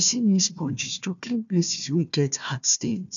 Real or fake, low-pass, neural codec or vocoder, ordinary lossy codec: fake; 7.2 kHz; codec, 16 kHz, 1 kbps, FunCodec, trained on Chinese and English, 50 frames a second; none